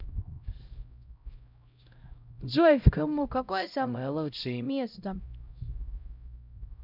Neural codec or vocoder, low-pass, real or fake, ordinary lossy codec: codec, 16 kHz, 0.5 kbps, X-Codec, HuBERT features, trained on LibriSpeech; 5.4 kHz; fake; none